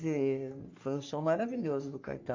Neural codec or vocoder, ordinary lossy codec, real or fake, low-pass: codec, 44.1 kHz, 3.4 kbps, Pupu-Codec; AAC, 48 kbps; fake; 7.2 kHz